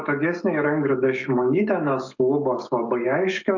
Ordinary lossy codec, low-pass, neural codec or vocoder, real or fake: MP3, 48 kbps; 7.2 kHz; autoencoder, 48 kHz, 128 numbers a frame, DAC-VAE, trained on Japanese speech; fake